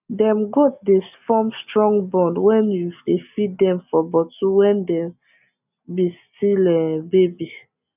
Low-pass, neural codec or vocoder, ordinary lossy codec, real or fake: 3.6 kHz; none; none; real